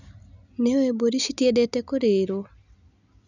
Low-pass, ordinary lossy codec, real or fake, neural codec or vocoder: 7.2 kHz; none; real; none